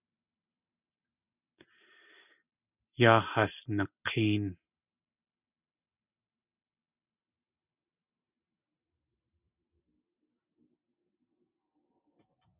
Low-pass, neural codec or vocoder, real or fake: 3.6 kHz; none; real